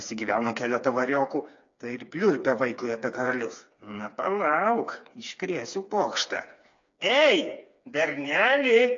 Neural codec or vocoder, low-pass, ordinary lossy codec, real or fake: codec, 16 kHz, 4 kbps, FreqCodec, smaller model; 7.2 kHz; AAC, 48 kbps; fake